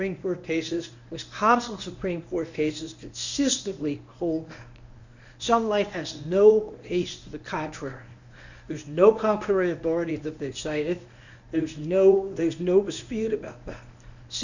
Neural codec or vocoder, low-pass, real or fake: codec, 24 kHz, 0.9 kbps, WavTokenizer, medium speech release version 1; 7.2 kHz; fake